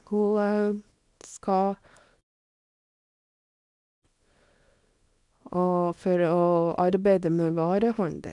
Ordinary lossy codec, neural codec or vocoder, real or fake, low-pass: none; codec, 24 kHz, 0.9 kbps, WavTokenizer, small release; fake; 10.8 kHz